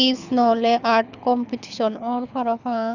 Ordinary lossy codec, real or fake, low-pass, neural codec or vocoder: none; fake; 7.2 kHz; codec, 24 kHz, 6 kbps, HILCodec